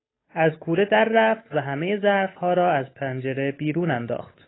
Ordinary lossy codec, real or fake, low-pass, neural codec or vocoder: AAC, 16 kbps; fake; 7.2 kHz; codec, 16 kHz, 8 kbps, FunCodec, trained on Chinese and English, 25 frames a second